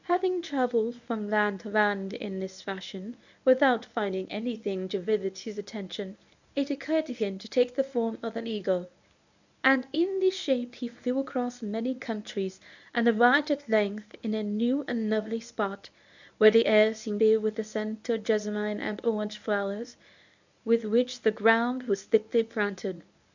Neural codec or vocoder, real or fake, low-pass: codec, 24 kHz, 0.9 kbps, WavTokenizer, medium speech release version 1; fake; 7.2 kHz